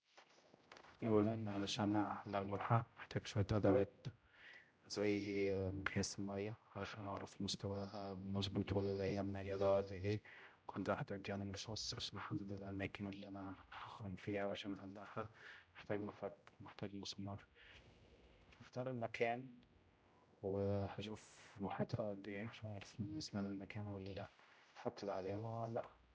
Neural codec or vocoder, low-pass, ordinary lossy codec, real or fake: codec, 16 kHz, 0.5 kbps, X-Codec, HuBERT features, trained on general audio; none; none; fake